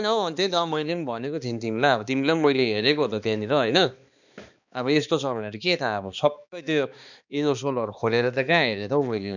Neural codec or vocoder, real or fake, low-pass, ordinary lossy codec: codec, 16 kHz, 2 kbps, X-Codec, HuBERT features, trained on balanced general audio; fake; 7.2 kHz; none